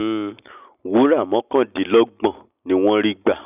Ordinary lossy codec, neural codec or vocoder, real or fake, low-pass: none; none; real; 3.6 kHz